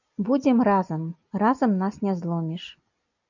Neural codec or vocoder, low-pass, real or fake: none; 7.2 kHz; real